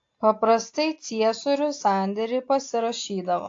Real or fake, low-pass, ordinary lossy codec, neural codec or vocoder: real; 7.2 kHz; AAC, 48 kbps; none